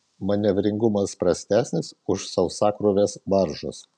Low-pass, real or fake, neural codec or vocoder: 9.9 kHz; real; none